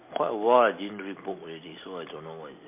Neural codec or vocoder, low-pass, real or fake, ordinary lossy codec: none; 3.6 kHz; real; MP3, 24 kbps